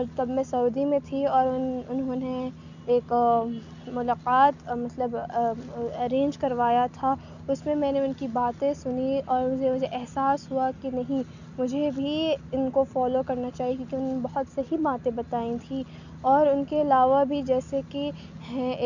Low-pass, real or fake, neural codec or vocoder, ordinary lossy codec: 7.2 kHz; real; none; none